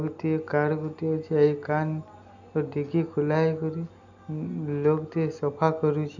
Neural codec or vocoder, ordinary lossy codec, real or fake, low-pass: none; none; real; 7.2 kHz